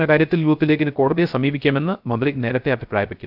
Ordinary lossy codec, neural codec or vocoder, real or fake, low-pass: none; codec, 16 kHz, 0.3 kbps, FocalCodec; fake; 5.4 kHz